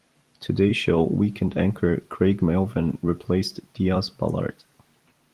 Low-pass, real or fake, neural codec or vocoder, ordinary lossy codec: 14.4 kHz; fake; vocoder, 44.1 kHz, 128 mel bands every 256 samples, BigVGAN v2; Opus, 24 kbps